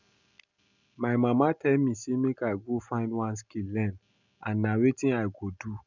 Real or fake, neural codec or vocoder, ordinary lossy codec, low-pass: real; none; none; 7.2 kHz